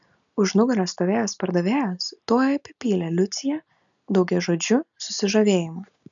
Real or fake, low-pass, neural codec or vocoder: real; 7.2 kHz; none